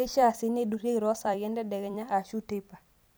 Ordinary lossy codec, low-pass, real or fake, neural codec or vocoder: none; none; fake; vocoder, 44.1 kHz, 128 mel bands every 256 samples, BigVGAN v2